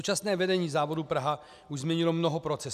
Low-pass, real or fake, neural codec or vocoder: 14.4 kHz; real; none